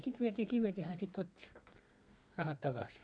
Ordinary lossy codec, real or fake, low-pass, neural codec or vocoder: MP3, 96 kbps; fake; 9.9 kHz; codec, 32 kHz, 1.9 kbps, SNAC